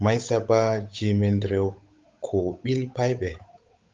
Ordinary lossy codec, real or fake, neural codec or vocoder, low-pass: Opus, 32 kbps; fake; codec, 16 kHz, 8 kbps, FunCodec, trained on Chinese and English, 25 frames a second; 7.2 kHz